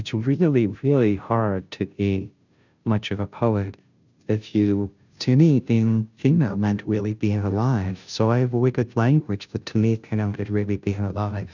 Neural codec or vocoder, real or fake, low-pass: codec, 16 kHz, 0.5 kbps, FunCodec, trained on Chinese and English, 25 frames a second; fake; 7.2 kHz